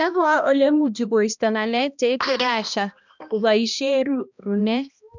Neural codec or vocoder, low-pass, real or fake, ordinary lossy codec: codec, 16 kHz, 1 kbps, X-Codec, HuBERT features, trained on balanced general audio; 7.2 kHz; fake; none